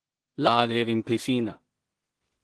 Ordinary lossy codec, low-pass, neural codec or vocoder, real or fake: Opus, 16 kbps; 10.8 kHz; codec, 16 kHz in and 24 kHz out, 0.4 kbps, LongCat-Audio-Codec, two codebook decoder; fake